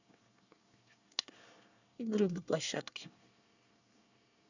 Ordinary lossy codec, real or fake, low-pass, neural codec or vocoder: none; fake; 7.2 kHz; codec, 24 kHz, 1 kbps, SNAC